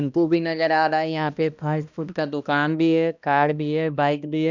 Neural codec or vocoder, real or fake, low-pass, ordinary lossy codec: codec, 16 kHz, 1 kbps, X-Codec, HuBERT features, trained on balanced general audio; fake; 7.2 kHz; none